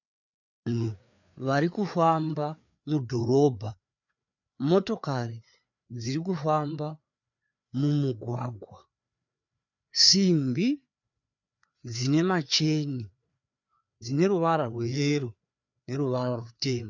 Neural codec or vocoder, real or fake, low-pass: codec, 16 kHz, 4 kbps, FreqCodec, larger model; fake; 7.2 kHz